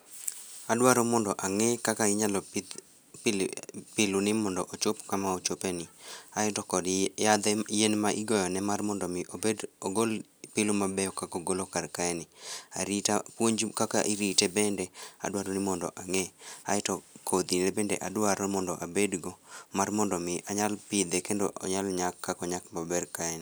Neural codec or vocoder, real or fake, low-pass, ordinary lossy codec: none; real; none; none